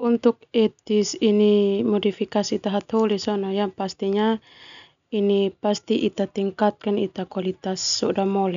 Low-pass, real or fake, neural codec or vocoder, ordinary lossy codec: 7.2 kHz; real; none; none